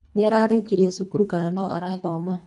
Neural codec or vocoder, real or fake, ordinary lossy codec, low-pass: codec, 24 kHz, 1.5 kbps, HILCodec; fake; none; 10.8 kHz